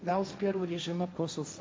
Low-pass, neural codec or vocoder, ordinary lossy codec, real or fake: none; codec, 16 kHz, 1.1 kbps, Voila-Tokenizer; none; fake